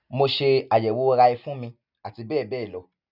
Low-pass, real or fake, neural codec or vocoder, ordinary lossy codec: 5.4 kHz; real; none; none